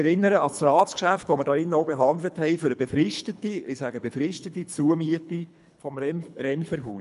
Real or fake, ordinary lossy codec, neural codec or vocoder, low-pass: fake; MP3, 96 kbps; codec, 24 kHz, 3 kbps, HILCodec; 10.8 kHz